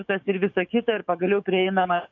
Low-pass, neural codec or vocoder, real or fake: 7.2 kHz; codec, 44.1 kHz, 7.8 kbps, DAC; fake